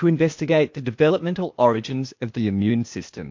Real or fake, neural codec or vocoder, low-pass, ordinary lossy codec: fake; codec, 16 kHz, 0.8 kbps, ZipCodec; 7.2 kHz; MP3, 48 kbps